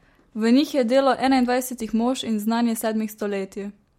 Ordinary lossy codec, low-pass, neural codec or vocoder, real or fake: MP3, 64 kbps; 19.8 kHz; none; real